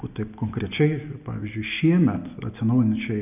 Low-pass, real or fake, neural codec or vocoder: 3.6 kHz; real; none